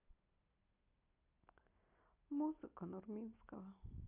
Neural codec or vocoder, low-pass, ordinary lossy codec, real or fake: none; 3.6 kHz; AAC, 24 kbps; real